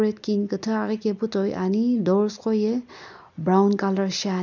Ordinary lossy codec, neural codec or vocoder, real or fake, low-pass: none; none; real; 7.2 kHz